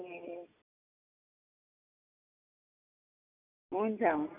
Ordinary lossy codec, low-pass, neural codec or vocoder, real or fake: none; 3.6 kHz; none; real